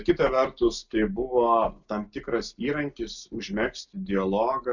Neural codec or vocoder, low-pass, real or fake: none; 7.2 kHz; real